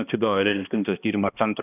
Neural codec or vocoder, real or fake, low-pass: codec, 16 kHz, 1 kbps, X-Codec, HuBERT features, trained on balanced general audio; fake; 3.6 kHz